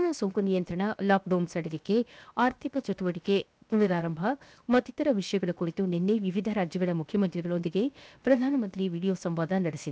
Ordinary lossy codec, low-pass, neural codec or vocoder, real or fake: none; none; codec, 16 kHz, 0.7 kbps, FocalCodec; fake